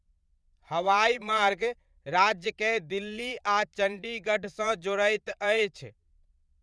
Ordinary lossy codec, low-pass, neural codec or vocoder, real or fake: none; none; vocoder, 22.05 kHz, 80 mel bands, Vocos; fake